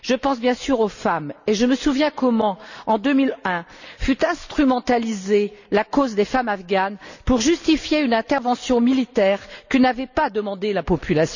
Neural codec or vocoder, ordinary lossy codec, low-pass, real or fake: none; none; 7.2 kHz; real